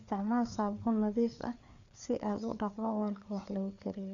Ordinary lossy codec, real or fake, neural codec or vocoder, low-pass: none; fake; codec, 16 kHz, 4 kbps, FreqCodec, larger model; 7.2 kHz